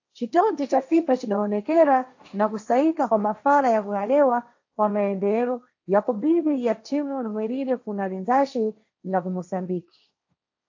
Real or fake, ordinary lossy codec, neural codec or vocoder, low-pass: fake; AAC, 48 kbps; codec, 16 kHz, 1.1 kbps, Voila-Tokenizer; 7.2 kHz